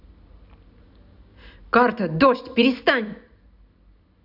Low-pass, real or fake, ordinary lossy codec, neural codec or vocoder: 5.4 kHz; real; none; none